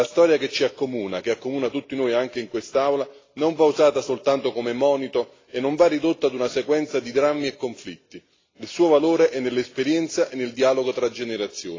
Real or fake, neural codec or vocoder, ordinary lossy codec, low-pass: real; none; AAC, 32 kbps; 7.2 kHz